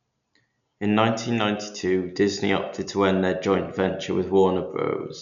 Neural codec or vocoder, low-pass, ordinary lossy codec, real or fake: none; 7.2 kHz; none; real